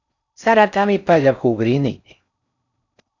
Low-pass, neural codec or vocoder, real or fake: 7.2 kHz; codec, 16 kHz in and 24 kHz out, 0.6 kbps, FocalCodec, streaming, 4096 codes; fake